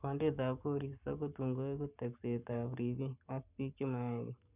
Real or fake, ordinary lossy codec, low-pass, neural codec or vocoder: fake; none; 3.6 kHz; codec, 44.1 kHz, 7.8 kbps, Pupu-Codec